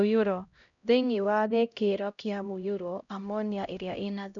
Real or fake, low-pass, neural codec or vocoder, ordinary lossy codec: fake; 7.2 kHz; codec, 16 kHz, 0.5 kbps, X-Codec, HuBERT features, trained on LibriSpeech; none